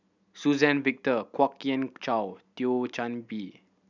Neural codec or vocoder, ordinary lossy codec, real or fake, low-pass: none; none; real; 7.2 kHz